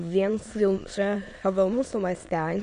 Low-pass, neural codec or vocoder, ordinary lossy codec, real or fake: 9.9 kHz; autoencoder, 22.05 kHz, a latent of 192 numbers a frame, VITS, trained on many speakers; MP3, 48 kbps; fake